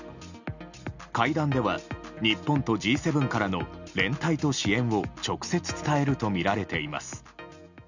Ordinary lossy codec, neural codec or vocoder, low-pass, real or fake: none; none; 7.2 kHz; real